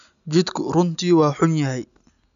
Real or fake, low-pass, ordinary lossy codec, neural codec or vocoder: real; 7.2 kHz; none; none